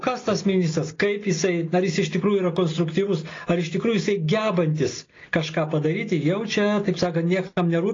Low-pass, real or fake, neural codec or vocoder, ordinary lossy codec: 7.2 kHz; real; none; AAC, 32 kbps